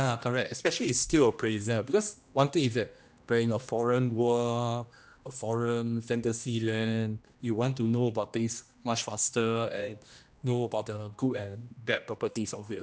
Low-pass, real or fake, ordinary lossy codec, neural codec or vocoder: none; fake; none; codec, 16 kHz, 1 kbps, X-Codec, HuBERT features, trained on general audio